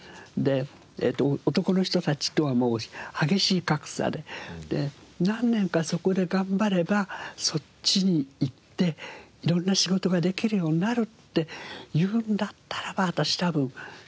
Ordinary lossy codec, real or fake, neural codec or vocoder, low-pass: none; real; none; none